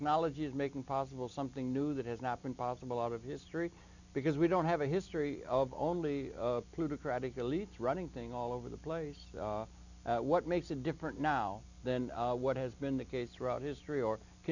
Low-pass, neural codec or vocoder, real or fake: 7.2 kHz; none; real